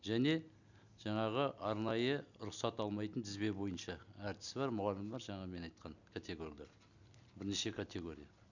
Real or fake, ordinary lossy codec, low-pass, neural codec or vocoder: real; none; 7.2 kHz; none